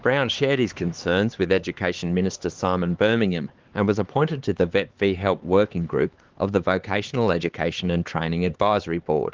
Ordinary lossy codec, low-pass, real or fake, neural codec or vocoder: Opus, 16 kbps; 7.2 kHz; fake; codec, 16 kHz, 4 kbps, X-Codec, HuBERT features, trained on LibriSpeech